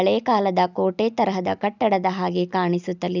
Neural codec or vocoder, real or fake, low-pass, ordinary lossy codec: none; real; 7.2 kHz; none